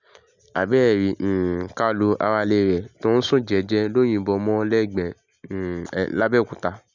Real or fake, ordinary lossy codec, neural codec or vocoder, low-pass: real; none; none; 7.2 kHz